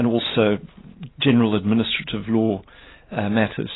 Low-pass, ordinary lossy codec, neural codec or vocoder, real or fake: 7.2 kHz; AAC, 16 kbps; none; real